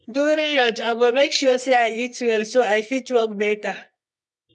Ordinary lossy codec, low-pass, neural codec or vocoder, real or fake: none; none; codec, 24 kHz, 0.9 kbps, WavTokenizer, medium music audio release; fake